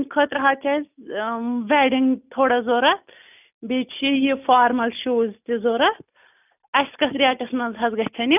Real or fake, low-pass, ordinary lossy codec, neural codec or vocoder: real; 3.6 kHz; none; none